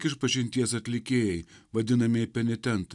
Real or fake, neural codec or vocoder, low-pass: real; none; 10.8 kHz